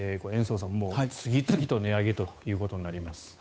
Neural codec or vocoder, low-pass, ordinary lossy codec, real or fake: codec, 16 kHz, 8 kbps, FunCodec, trained on Chinese and English, 25 frames a second; none; none; fake